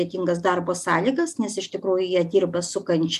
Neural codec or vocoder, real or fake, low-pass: none; real; 14.4 kHz